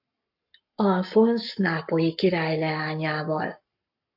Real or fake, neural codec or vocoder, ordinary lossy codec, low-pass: fake; codec, 44.1 kHz, 7.8 kbps, Pupu-Codec; AAC, 48 kbps; 5.4 kHz